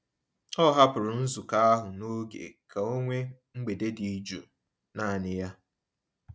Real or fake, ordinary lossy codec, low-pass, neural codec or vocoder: real; none; none; none